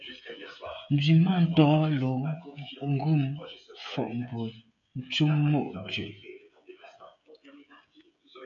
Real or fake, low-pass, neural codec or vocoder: fake; 7.2 kHz; codec, 16 kHz, 16 kbps, FreqCodec, smaller model